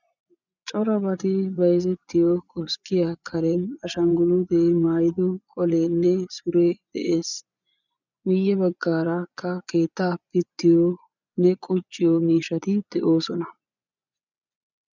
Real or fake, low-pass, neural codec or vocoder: fake; 7.2 kHz; vocoder, 24 kHz, 100 mel bands, Vocos